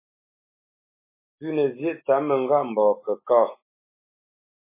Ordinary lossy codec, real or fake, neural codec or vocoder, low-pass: MP3, 16 kbps; fake; autoencoder, 48 kHz, 128 numbers a frame, DAC-VAE, trained on Japanese speech; 3.6 kHz